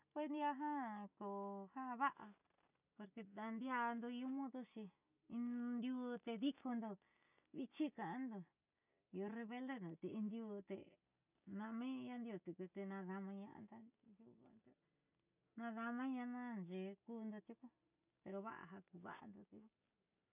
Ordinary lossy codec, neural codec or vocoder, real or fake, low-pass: none; none; real; 3.6 kHz